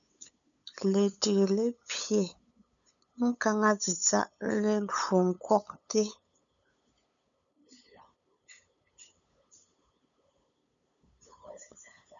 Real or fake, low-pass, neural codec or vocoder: fake; 7.2 kHz; codec, 16 kHz, 8 kbps, FunCodec, trained on LibriTTS, 25 frames a second